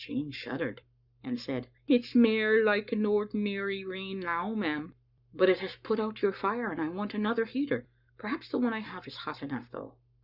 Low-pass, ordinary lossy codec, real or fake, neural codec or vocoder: 5.4 kHz; Opus, 64 kbps; fake; codec, 44.1 kHz, 7.8 kbps, Pupu-Codec